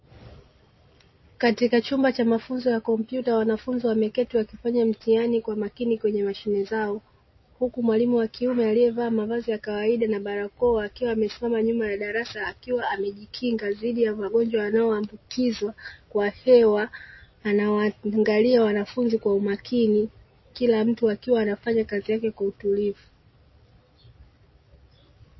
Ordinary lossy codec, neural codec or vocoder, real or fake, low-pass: MP3, 24 kbps; none; real; 7.2 kHz